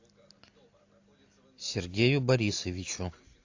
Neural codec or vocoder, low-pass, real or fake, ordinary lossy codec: none; 7.2 kHz; real; AAC, 48 kbps